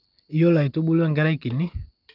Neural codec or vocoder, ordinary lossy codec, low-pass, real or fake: none; Opus, 24 kbps; 5.4 kHz; real